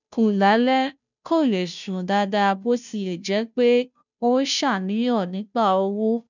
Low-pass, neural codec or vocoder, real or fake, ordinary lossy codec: 7.2 kHz; codec, 16 kHz, 0.5 kbps, FunCodec, trained on Chinese and English, 25 frames a second; fake; none